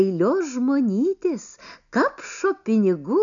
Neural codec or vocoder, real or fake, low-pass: none; real; 7.2 kHz